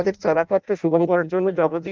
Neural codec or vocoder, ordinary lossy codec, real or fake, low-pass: codec, 16 kHz in and 24 kHz out, 0.6 kbps, FireRedTTS-2 codec; Opus, 24 kbps; fake; 7.2 kHz